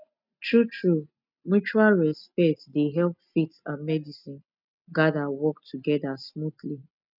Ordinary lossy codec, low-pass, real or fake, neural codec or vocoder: none; 5.4 kHz; real; none